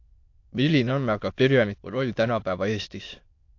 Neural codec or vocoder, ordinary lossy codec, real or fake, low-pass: autoencoder, 22.05 kHz, a latent of 192 numbers a frame, VITS, trained on many speakers; AAC, 48 kbps; fake; 7.2 kHz